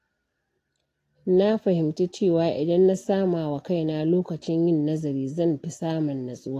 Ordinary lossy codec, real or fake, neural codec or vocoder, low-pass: AAC, 48 kbps; real; none; 14.4 kHz